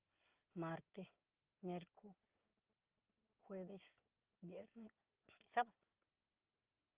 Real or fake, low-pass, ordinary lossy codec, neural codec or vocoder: real; 3.6 kHz; Opus, 32 kbps; none